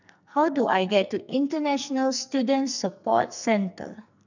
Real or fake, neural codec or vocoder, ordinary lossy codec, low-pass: fake; codec, 44.1 kHz, 2.6 kbps, SNAC; none; 7.2 kHz